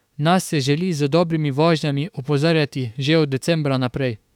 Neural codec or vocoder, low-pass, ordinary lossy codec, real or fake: autoencoder, 48 kHz, 32 numbers a frame, DAC-VAE, trained on Japanese speech; 19.8 kHz; none; fake